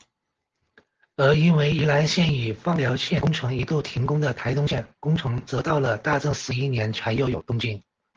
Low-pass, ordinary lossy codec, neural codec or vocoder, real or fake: 7.2 kHz; Opus, 16 kbps; none; real